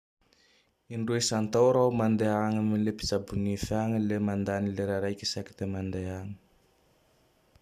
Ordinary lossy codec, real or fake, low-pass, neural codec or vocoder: none; real; 14.4 kHz; none